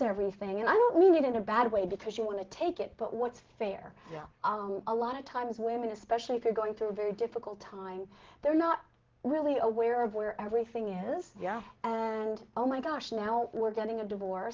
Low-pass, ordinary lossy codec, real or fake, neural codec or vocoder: 7.2 kHz; Opus, 16 kbps; real; none